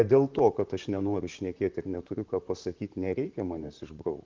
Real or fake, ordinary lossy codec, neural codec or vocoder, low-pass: fake; Opus, 32 kbps; vocoder, 44.1 kHz, 128 mel bands, Pupu-Vocoder; 7.2 kHz